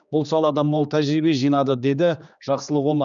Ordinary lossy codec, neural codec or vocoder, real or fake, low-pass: none; codec, 16 kHz, 2 kbps, X-Codec, HuBERT features, trained on general audio; fake; 7.2 kHz